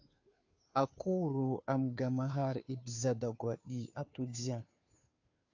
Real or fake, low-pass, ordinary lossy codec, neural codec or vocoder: fake; 7.2 kHz; AAC, 48 kbps; codec, 16 kHz, 2 kbps, FunCodec, trained on Chinese and English, 25 frames a second